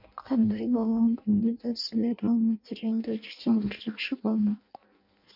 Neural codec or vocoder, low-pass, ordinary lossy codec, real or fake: codec, 16 kHz in and 24 kHz out, 0.6 kbps, FireRedTTS-2 codec; 5.4 kHz; MP3, 48 kbps; fake